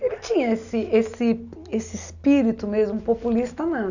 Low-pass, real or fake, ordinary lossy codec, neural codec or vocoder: 7.2 kHz; real; none; none